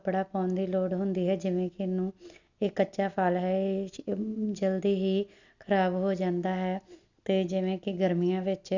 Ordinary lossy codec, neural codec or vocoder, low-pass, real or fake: none; none; 7.2 kHz; real